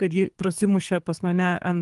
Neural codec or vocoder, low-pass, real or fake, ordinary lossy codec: codec, 24 kHz, 3 kbps, HILCodec; 10.8 kHz; fake; Opus, 32 kbps